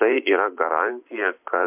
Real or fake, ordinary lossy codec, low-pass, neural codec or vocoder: fake; MP3, 32 kbps; 3.6 kHz; vocoder, 44.1 kHz, 128 mel bands every 512 samples, BigVGAN v2